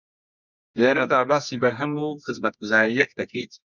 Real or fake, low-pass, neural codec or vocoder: fake; 7.2 kHz; codec, 24 kHz, 0.9 kbps, WavTokenizer, medium music audio release